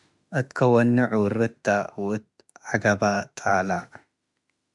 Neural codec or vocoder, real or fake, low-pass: autoencoder, 48 kHz, 32 numbers a frame, DAC-VAE, trained on Japanese speech; fake; 10.8 kHz